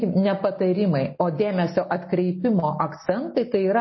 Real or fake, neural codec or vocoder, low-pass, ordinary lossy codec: real; none; 7.2 kHz; MP3, 24 kbps